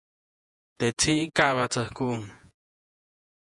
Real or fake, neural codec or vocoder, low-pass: fake; vocoder, 48 kHz, 128 mel bands, Vocos; 10.8 kHz